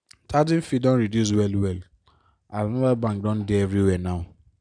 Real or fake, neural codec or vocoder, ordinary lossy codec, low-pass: real; none; none; 9.9 kHz